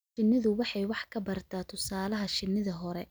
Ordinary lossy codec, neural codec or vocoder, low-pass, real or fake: none; none; none; real